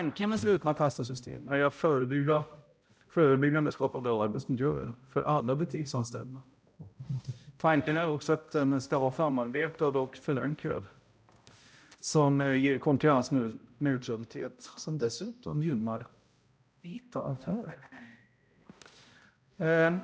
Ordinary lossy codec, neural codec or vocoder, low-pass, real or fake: none; codec, 16 kHz, 0.5 kbps, X-Codec, HuBERT features, trained on balanced general audio; none; fake